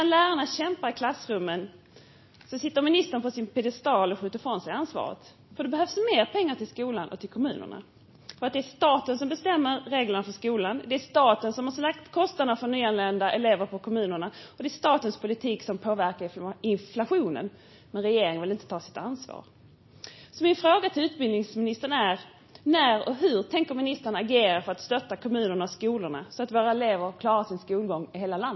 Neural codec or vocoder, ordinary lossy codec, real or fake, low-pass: none; MP3, 24 kbps; real; 7.2 kHz